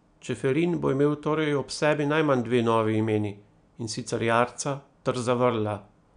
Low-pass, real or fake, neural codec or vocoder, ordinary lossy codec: 9.9 kHz; real; none; none